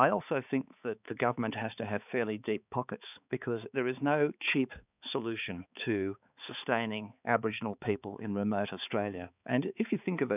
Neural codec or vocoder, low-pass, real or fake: codec, 16 kHz, 4 kbps, X-Codec, HuBERT features, trained on balanced general audio; 3.6 kHz; fake